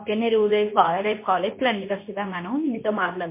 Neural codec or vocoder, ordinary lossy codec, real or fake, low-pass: codec, 24 kHz, 0.9 kbps, WavTokenizer, medium speech release version 2; MP3, 24 kbps; fake; 3.6 kHz